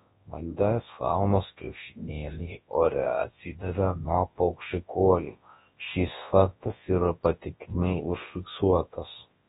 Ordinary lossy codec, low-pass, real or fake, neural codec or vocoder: AAC, 16 kbps; 10.8 kHz; fake; codec, 24 kHz, 0.9 kbps, WavTokenizer, large speech release